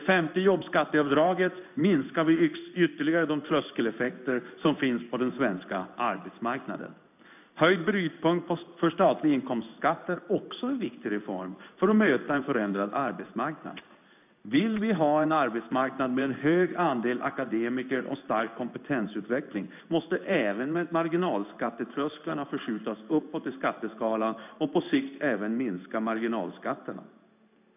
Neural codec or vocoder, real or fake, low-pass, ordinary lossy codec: codec, 16 kHz in and 24 kHz out, 1 kbps, XY-Tokenizer; fake; 3.6 kHz; none